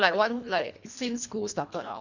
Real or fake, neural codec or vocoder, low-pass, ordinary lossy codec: fake; codec, 24 kHz, 1.5 kbps, HILCodec; 7.2 kHz; none